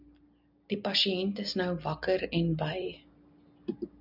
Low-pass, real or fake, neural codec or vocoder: 5.4 kHz; real; none